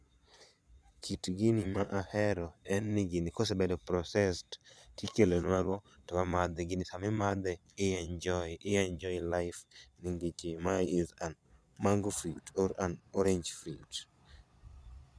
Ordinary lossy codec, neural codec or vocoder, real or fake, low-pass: none; vocoder, 22.05 kHz, 80 mel bands, Vocos; fake; none